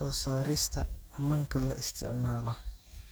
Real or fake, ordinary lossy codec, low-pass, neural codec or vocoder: fake; none; none; codec, 44.1 kHz, 2.6 kbps, DAC